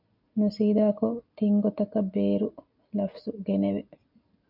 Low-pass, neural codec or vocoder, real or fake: 5.4 kHz; none; real